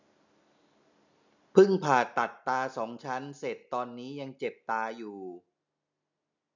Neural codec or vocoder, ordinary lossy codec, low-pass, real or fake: none; none; 7.2 kHz; real